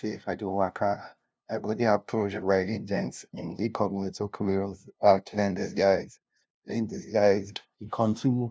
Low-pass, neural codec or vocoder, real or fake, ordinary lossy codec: none; codec, 16 kHz, 0.5 kbps, FunCodec, trained on LibriTTS, 25 frames a second; fake; none